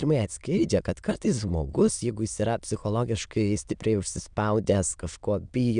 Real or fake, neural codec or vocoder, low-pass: fake; autoencoder, 22.05 kHz, a latent of 192 numbers a frame, VITS, trained on many speakers; 9.9 kHz